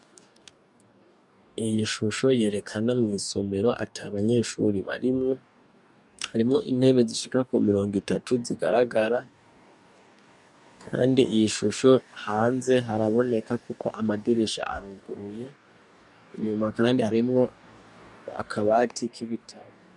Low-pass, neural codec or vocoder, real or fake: 10.8 kHz; codec, 44.1 kHz, 2.6 kbps, DAC; fake